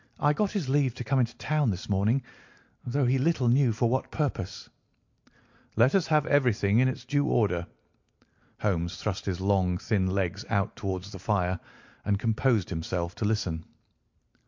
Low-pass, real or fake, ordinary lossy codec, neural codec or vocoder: 7.2 kHz; real; MP3, 48 kbps; none